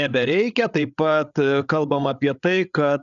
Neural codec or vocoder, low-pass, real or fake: codec, 16 kHz, 8 kbps, FreqCodec, larger model; 7.2 kHz; fake